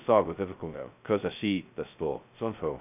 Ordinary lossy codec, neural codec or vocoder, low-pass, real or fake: none; codec, 16 kHz, 0.2 kbps, FocalCodec; 3.6 kHz; fake